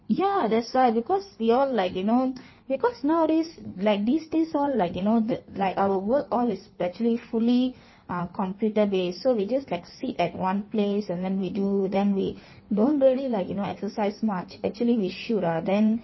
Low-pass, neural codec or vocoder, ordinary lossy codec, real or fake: 7.2 kHz; codec, 16 kHz in and 24 kHz out, 1.1 kbps, FireRedTTS-2 codec; MP3, 24 kbps; fake